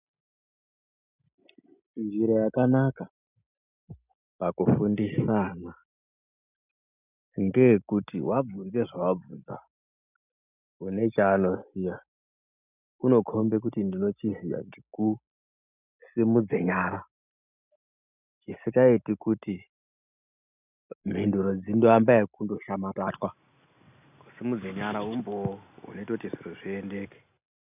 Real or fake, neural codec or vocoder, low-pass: real; none; 3.6 kHz